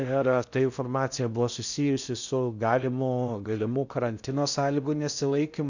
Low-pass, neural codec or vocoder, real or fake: 7.2 kHz; codec, 16 kHz in and 24 kHz out, 0.6 kbps, FocalCodec, streaming, 4096 codes; fake